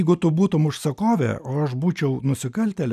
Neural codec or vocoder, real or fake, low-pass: none; real; 14.4 kHz